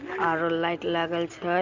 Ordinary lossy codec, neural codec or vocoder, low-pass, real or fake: Opus, 32 kbps; none; 7.2 kHz; real